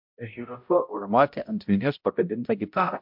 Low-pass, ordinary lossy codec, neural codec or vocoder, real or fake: 5.4 kHz; MP3, 48 kbps; codec, 16 kHz, 0.5 kbps, X-Codec, HuBERT features, trained on balanced general audio; fake